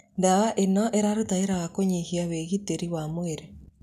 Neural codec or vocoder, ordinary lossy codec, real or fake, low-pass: none; none; real; 14.4 kHz